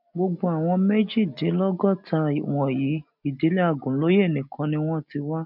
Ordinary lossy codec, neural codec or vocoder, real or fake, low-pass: MP3, 48 kbps; none; real; 5.4 kHz